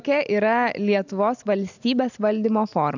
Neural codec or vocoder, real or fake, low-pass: none; real; 7.2 kHz